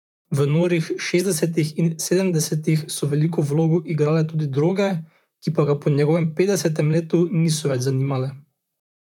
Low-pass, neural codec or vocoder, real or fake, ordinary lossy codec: 19.8 kHz; vocoder, 44.1 kHz, 128 mel bands, Pupu-Vocoder; fake; none